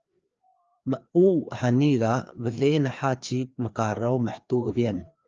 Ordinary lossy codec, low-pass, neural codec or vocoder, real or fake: Opus, 24 kbps; 7.2 kHz; codec, 16 kHz, 2 kbps, FreqCodec, larger model; fake